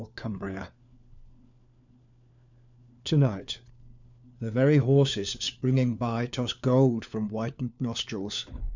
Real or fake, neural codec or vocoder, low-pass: fake; codec, 16 kHz, 4 kbps, FunCodec, trained on LibriTTS, 50 frames a second; 7.2 kHz